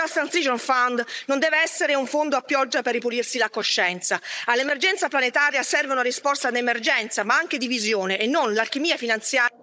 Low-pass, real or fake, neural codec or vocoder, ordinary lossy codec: none; fake; codec, 16 kHz, 16 kbps, FunCodec, trained on Chinese and English, 50 frames a second; none